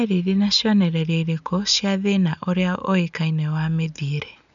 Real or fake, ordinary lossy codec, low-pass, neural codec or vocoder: real; none; 7.2 kHz; none